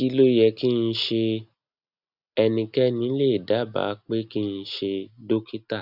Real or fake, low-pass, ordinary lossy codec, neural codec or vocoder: real; 5.4 kHz; none; none